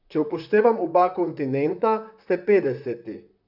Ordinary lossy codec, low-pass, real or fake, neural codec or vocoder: none; 5.4 kHz; fake; vocoder, 44.1 kHz, 128 mel bands, Pupu-Vocoder